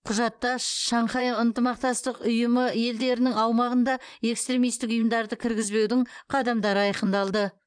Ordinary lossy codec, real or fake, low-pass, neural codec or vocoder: none; fake; 9.9 kHz; vocoder, 44.1 kHz, 128 mel bands, Pupu-Vocoder